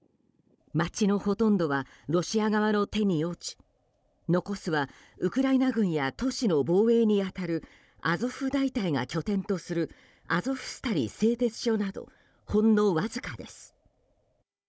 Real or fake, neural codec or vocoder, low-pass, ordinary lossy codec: fake; codec, 16 kHz, 16 kbps, FunCodec, trained on Chinese and English, 50 frames a second; none; none